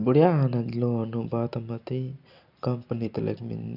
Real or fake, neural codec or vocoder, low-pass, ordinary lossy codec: real; none; 5.4 kHz; none